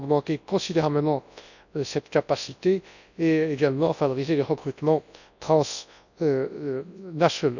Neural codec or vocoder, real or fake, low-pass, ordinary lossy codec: codec, 24 kHz, 0.9 kbps, WavTokenizer, large speech release; fake; 7.2 kHz; none